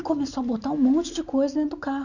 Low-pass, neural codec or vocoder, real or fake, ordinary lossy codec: 7.2 kHz; none; real; none